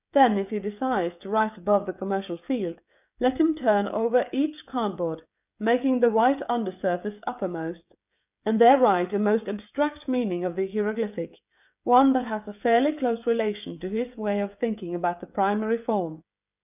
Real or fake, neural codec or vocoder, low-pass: fake; codec, 16 kHz, 16 kbps, FreqCodec, smaller model; 3.6 kHz